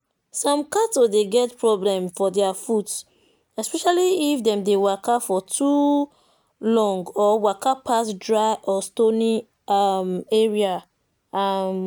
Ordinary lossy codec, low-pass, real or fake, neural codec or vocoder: none; none; real; none